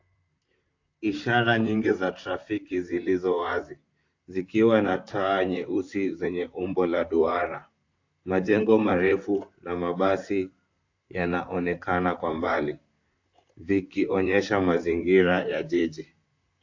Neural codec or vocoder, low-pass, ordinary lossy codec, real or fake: vocoder, 44.1 kHz, 128 mel bands, Pupu-Vocoder; 7.2 kHz; AAC, 48 kbps; fake